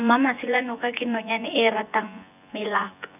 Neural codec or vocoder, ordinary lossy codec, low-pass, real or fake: vocoder, 24 kHz, 100 mel bands, Vocos; none; 3.6 kHz; fake